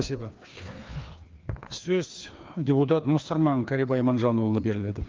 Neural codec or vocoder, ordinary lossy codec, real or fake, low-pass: codec, 16 kHz, 2 kbps, FreqCodec, larger model; Opus, 32 kbps; fake; 7.2 kHz